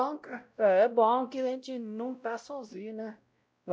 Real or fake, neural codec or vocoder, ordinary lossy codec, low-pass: fake; codec, 16 kHz, 0.5 kbps, X-Codec, WavLM features, trained on Multilingual LibriSpeech; none; none